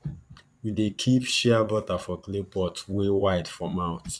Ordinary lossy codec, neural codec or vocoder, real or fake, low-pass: none; vocoder, 22.05 kHz, 80 mel bands, Vocos; fake; none